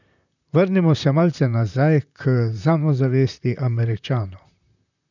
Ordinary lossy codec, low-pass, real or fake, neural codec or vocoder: none; 7.2 kHz; fake; vocoder, 44.1 kHz, 128 mel bands, Pupu-Vocoder